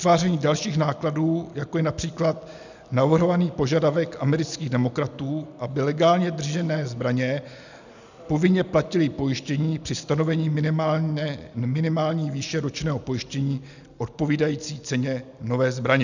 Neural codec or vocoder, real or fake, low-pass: none; real; 7.2 kHz